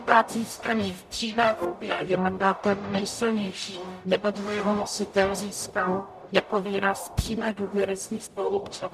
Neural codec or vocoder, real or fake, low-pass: codec, 44.1 kHz, 0.9 kbps, DAC; fake; 14.4 kHz